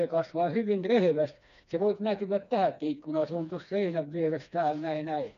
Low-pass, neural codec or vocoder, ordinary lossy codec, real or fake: 7.2 kHz; codec, 16 kHz, 2 kbps, FreqCodec, smaller model; none; fake